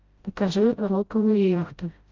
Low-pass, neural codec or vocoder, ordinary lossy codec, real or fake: 7.2 kHz; codec, 16 kHz, 0.5 kbps, FreqCodec, smaller model; Opus, 32 kbps; fake